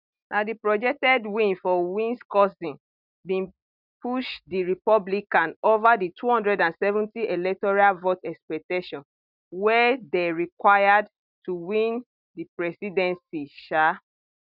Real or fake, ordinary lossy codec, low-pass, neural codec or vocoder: real; none; 5.4 kHz; none